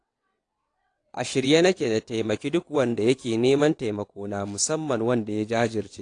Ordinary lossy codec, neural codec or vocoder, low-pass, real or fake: AAC, 48 kbps; vocoder, 22.05 kHz, 80 mel bands, WaveNeXt; 9.9 kHz; fake